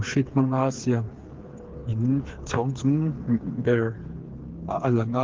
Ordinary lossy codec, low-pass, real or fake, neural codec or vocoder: Opus, 16 kbps; 7.2 kHz; fake; codec, 16 kHz, 4 kbps, FreqCodec, smaller model